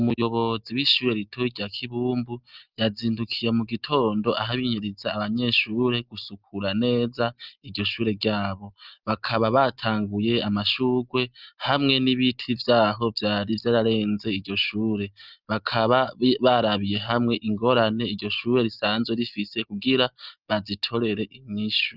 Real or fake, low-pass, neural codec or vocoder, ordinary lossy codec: real; 5.4 kHz; none; Opus, 24 kbps